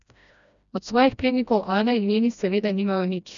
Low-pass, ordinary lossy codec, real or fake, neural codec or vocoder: 7.2 kHz; none; fake; codec, 16 kHz, 1 kbps, FreqCodec, smaller model